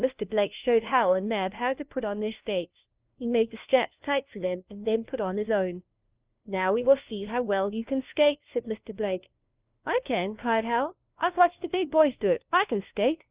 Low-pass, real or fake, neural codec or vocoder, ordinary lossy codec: 3.6 kHz; fake; codec, 16 kHz, 1 kbps, FunCodec, trained on LibriTTS, 50 frames a second; Opus, 32 kbps